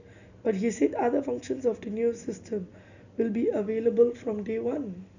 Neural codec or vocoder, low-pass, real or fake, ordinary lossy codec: none; 7.2 kHz; real; none